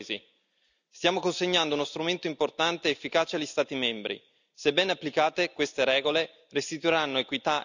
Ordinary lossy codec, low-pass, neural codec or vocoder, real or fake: none; 7.2 kHz; none; real